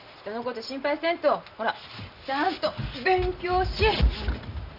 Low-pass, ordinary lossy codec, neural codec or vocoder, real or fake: 5.4 kHz; none; none; real